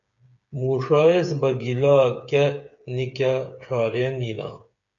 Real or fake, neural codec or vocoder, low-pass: fake; codec, 16 kHz, 8 kbps, FreqCodec, smaller model; 7.2 kHz